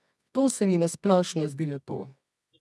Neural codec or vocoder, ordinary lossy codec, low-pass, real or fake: codec, 24 kHz, 0.9 kbps, WavTokenizer, medium music audio release; none; none; fake